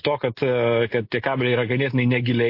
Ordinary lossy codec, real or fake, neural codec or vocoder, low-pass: MP3, 32 kbps; real; none; 5.4 kHz